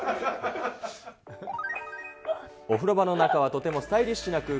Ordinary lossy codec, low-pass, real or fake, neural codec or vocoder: none; none; real; none